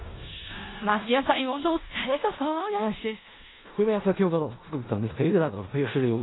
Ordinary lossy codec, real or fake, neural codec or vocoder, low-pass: AAC, 16 kbps; fake; codec, 16 kHz in and 24 kHz out, 0.4 kbps, LongCat-Audio-Codec, four codebook decoder; 7.2 kHz